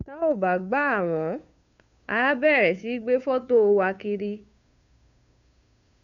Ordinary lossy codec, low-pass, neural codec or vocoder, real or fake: none; 7.2 kHz; codec, 16 kHz, 2 kbps, FunCodec, trained on Chinese and English, 25 frames a second; fake